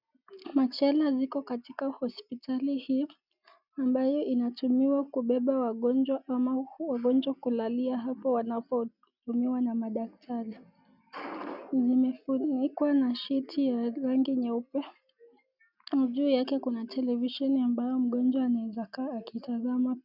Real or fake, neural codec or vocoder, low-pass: real; none; 5.4 kHz